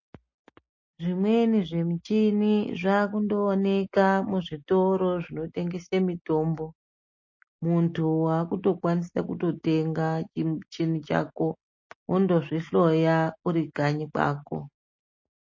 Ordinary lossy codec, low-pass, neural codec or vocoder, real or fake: MP3, 32 kbps; 7.2 kHz; none; real